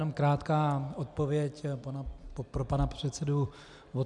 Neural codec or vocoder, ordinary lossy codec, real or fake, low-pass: none; MP3, 96 kbps; real; 10.8 kHz